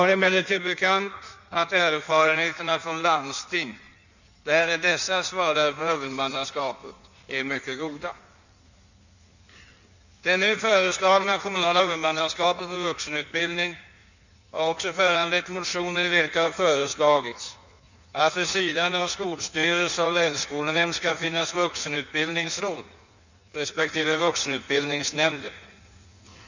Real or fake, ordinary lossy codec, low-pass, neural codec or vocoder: fake; none; 7.2 kHz; codec, 16 kHz in and 24 kHz out, 1.1 kbps, FireRedTTS-2 codec